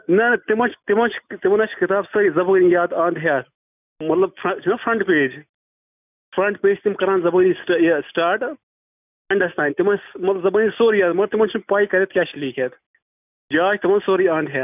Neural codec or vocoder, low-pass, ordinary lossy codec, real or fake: none; 3.6 kHz; none; real